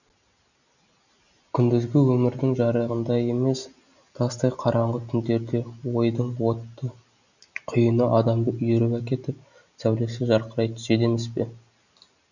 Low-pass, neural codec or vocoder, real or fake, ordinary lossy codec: 7.2 kHz; none; real; none